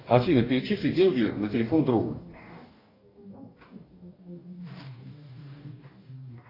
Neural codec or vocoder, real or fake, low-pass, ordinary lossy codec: codec, 44.1 kHz, 2.6 kbps, DAC; fake; 5.4 kHz; AAC, 24 kbps